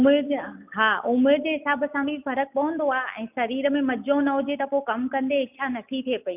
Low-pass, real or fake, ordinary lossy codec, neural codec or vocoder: 3.6 kHz; real; none; none